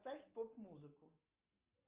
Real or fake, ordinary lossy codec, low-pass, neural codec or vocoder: real; Opus, 32 kbps; 3.6 kHz; none